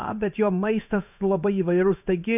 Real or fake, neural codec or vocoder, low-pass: fake; codec, 16 kHz in and 24 kHz out, 1 kbps, XY-Tokenizer; 3.6 kHz